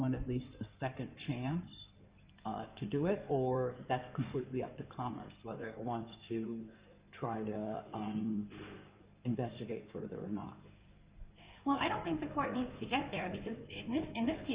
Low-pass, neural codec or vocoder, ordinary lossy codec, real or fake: 3.6 kHz; codec, 16 kHz in and 24 kHz out, 2.2 kbps, FireRedTTS-2 codec; Opus, 64 kbps; fake